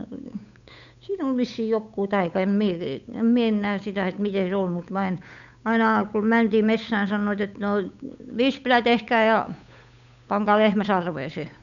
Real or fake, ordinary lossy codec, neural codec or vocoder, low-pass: fake; none; codec, 16 kHz, 8 kbps, FunCodec, trained on Chinese and English, 25 frames a second; 7.2 kHz